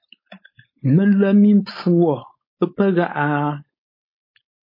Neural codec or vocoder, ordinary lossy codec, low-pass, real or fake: codec, 16 kHz, 4.8 kbps, FACodec; MP3, 24 kbps; 5.4 kHz; fake